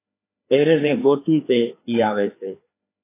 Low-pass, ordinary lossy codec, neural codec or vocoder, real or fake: 3.6 kHz; AAC, 24 kbps; codec, 16 kHz, 2 kbps, FreqCodec, larger model; fake